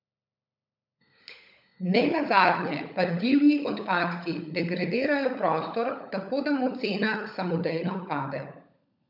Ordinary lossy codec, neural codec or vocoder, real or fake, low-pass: none; codec, 16 kHz, 16 kbps, FunCodec, trained on LibriTTS, 50 frames a second; fake; 5.4 kHz